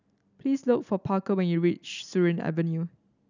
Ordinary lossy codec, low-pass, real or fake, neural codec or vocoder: none; 7.2 kHz; real; none